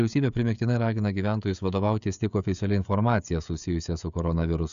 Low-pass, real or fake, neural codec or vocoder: 7.2 kHz; fake; codec, 16 kHz, 16 kbps, FreqCodec, smaller model